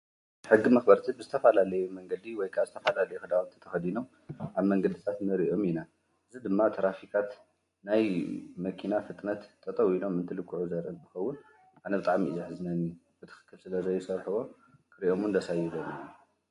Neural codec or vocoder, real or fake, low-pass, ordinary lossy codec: none; real; 10.8 kHz; MP3, 48 kbps